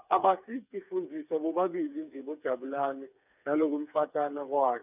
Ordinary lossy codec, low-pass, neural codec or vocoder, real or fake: none; 3.6 kHz; codec, 16 kHz, 4 kbps, FreqCodec, smaller model; fake